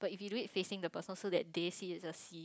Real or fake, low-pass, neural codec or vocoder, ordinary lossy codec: real; none; none; none